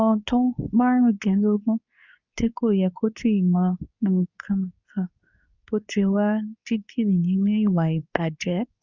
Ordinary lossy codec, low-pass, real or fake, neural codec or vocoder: none; 7.2 kHz; fake; codec, 24 kHz, 0.9 kbps, WavTokenizer, medium speech release version 2